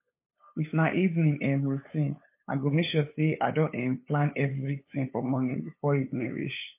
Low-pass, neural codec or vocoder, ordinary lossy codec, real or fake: 3.6 kHz; codec, 16 kHz, 8 kbps, FunCodec, trained on LibriTTS, 25 frames a second; MP3, 24 kbps; fake